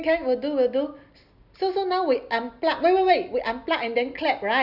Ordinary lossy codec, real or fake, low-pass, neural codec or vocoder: Opus, 64 kbps; real; 5.4 kHz; none